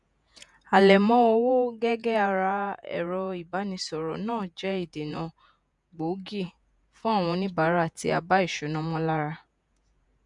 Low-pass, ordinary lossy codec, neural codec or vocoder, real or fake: 10.8 kHz; none; vocoder, 48 kHz, 128 mel bands, Vocos; fake